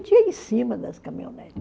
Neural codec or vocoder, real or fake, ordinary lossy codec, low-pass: none; real; none; none